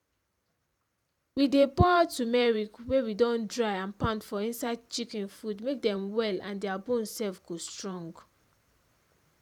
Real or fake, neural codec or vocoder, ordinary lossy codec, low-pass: fake; vocoder, 44.1 kHz, 128 mel bands every 512 samples, BigVGAN v2; none; 19.8 kHz